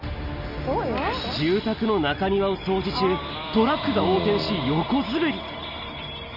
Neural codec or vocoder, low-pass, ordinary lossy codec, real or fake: none; 5.4 kHz; none; real